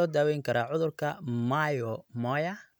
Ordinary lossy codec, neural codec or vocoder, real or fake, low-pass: none; none; real; none